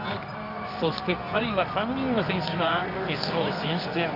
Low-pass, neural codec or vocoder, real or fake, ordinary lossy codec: 5.4 kHz; codec, 24 kHz, 0.9 kbps, WavTokenizer, medium music audio release; fake; none